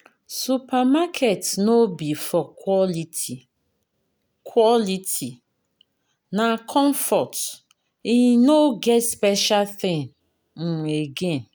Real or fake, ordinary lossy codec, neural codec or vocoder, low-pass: real; none; none; none